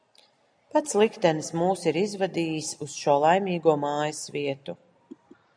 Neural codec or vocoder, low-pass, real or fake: none; 9.9 kHz; real